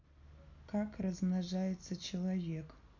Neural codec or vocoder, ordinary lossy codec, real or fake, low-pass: none; none; real; 7.2 kHz